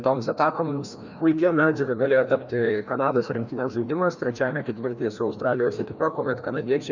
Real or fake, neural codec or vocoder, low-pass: fake; codec, 16 kHz, 1 kbps, FreqCodec, larger model; 7.2 kHz